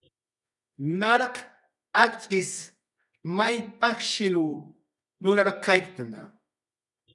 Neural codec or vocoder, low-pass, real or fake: codec, 24 kHz, 0.9 kbps, WavTokenizer, medium music audio release; 10.8 kHz; fake